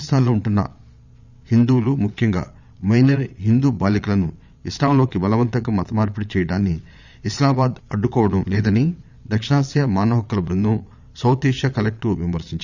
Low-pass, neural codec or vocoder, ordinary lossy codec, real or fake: 7.2 kHz; vocoder, 22.05 kHz, 80 mel bands, Vocos; none; fake